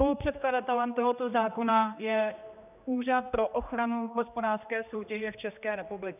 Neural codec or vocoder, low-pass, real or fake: codec, 16 kHz, 2 kbps, X-Codec, HuBERT features, trained on general audio; 3.6 kHz; fake